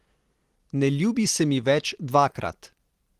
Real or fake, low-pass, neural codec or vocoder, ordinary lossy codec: real; 14.4 kHz; none; Opus, 16 kbps